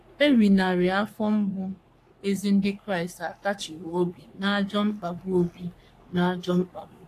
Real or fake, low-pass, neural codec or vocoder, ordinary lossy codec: fake; 14.4 kHz; codec, 44.1 kHz, 3.4 kbps, Pupu-Codec; AAC, 64 kbps